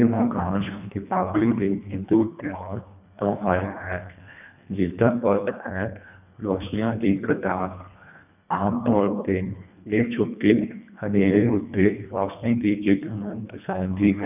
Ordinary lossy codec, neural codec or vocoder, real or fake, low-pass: none; codec, 24 kHz, 1.5 kbps, HILCodec; fake; 3.6 kHz